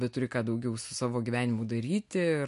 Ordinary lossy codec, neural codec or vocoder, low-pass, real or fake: MP3, 64 kbps; none; 10.8 kHz; real